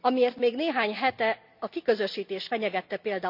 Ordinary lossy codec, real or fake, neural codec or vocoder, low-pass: none; real; none; 5.4 kHz